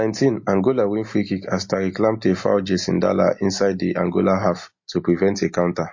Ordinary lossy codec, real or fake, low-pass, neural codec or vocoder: MP3, 32 kbps; real; 7.2 kHz; none